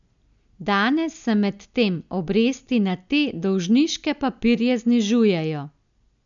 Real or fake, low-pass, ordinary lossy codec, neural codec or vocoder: real; 7.2 kHz; none; none